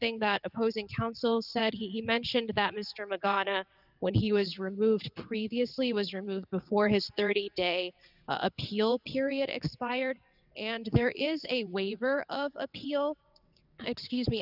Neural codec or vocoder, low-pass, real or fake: vocoder, 22.05 kHz, 80 mel bands, WaveNeXt; 5.4 kHz; fake